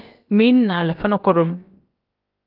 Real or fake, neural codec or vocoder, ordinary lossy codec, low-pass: fake; codec, 16 kHz, about 1 kbps, DyCAST, with the encoder's durations; Opus, 24 kbps; 5.4 kHz